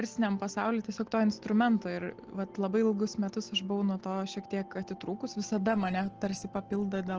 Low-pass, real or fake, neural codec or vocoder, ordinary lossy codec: 7.2 kHz; real; none; Opus, 16 kbps